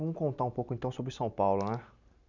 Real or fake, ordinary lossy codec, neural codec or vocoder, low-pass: real; none; none; 7.2 kHz